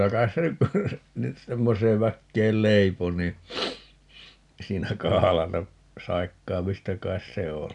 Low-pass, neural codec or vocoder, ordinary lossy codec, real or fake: 10.8 kHz; none; none; real